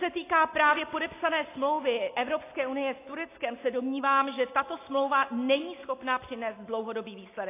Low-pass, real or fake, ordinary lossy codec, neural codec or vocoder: 3.6 kHz; real; AAC, 24 kbps; none